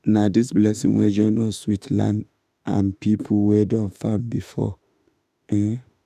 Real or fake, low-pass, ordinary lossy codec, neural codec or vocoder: fake; 14.4 kHz; none; autoencoder, 48 kHz, 32 numbers a frame, DAC-VAE, trained on Japanese speech